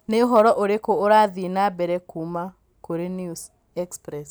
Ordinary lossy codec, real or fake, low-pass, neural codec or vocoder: none; real; none; none